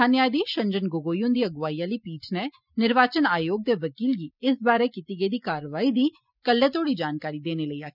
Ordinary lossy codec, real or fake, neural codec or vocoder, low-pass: none; real; none; 5.4 kHz